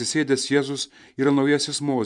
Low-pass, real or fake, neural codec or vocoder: 10.8 kHz; fake; vocoder, 44.1 kHz, 128 mel bands every 512 samples, BigVGAN v2